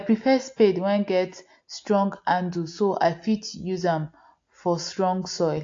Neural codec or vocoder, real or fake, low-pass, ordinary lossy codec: none; real; 7.2 kHz; AAC, 48 kbps